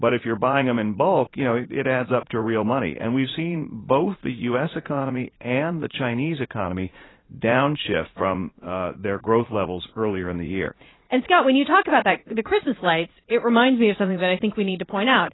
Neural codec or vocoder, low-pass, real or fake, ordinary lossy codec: vocoder, 44.1 kHz, 80 mel bands, Vocos; 7.2 kHz; fake; AAC, 16 kbps